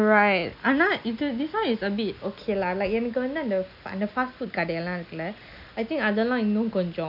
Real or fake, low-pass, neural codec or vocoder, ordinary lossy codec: real; 5.4 kHz; none; none